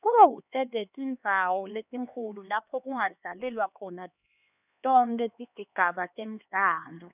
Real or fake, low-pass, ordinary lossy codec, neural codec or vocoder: fake; 3.6 kHz; none; codec, 16 kHz, 2 kbps, X-Codec, HuBERT features, trained on LibriSpeech